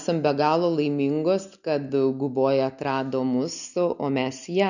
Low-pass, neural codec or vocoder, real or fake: 7.2 kHz; none; real